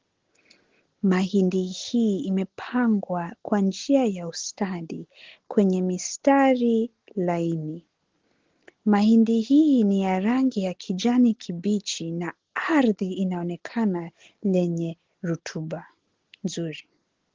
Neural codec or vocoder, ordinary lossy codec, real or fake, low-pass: none; Opus, 16 kbps; real; 7.2 kHz